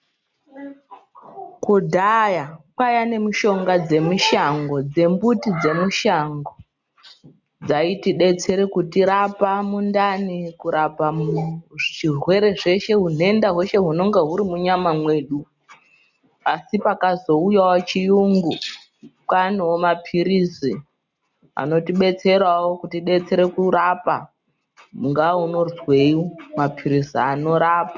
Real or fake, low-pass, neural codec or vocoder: real; 7.2 kHz; none